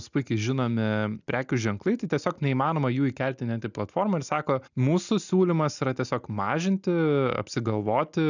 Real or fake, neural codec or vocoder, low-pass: real; none; 7.2 kHz